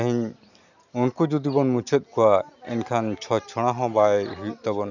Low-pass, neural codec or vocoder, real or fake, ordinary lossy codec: 7.2 kHz; none; real; none